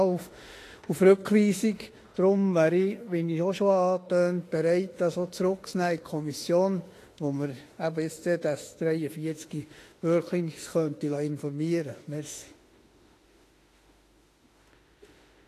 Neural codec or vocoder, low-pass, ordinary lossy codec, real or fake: autoencoder, 48 kHz, 32 numbers a frame, DAC-VAE, trained on Japanese speech; 14.4 kHz; AAC, 48 kbps; fake